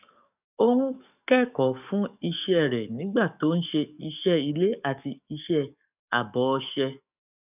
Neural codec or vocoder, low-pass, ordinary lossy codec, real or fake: codec, 44.1 kHz, 7.8 kbps, DAC; 3.6 kHz; none; fake